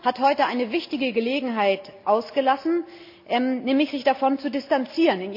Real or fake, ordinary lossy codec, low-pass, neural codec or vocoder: real; MP3, 48 kbps; 5.4 kHz; none